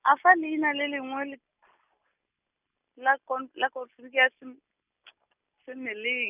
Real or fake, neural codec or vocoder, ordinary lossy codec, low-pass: real; none; none; 3.6 kHz